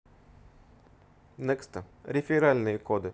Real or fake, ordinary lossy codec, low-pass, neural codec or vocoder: real; none; none; none